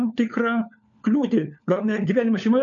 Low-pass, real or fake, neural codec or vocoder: 7.2 kHz; fake; codec, 16 kHz, 4 kbps, FunCodec, trained on LibriTTS, 50 frames a second